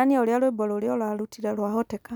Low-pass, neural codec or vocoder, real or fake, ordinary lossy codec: none; none; real; none